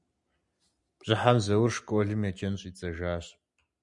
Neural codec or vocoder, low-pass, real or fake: none; 10.8 kHz; real